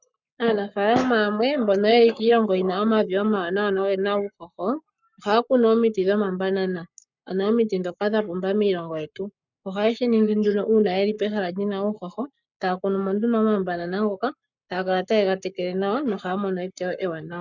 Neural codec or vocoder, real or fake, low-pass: codec, 44.1 kHz, 7.8 kbps, Pupu-Codec; fake; 7.2 kHz